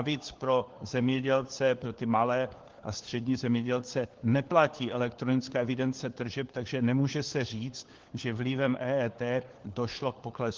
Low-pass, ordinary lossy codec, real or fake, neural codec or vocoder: 7.2 kHz; Opus, 16 kbps; fake; codec, 16 kHz, 4 kbps, FunCodec, trained on LibriTTS, 50 frames a second